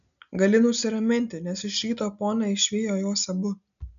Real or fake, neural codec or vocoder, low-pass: real; none; 7.2 kHz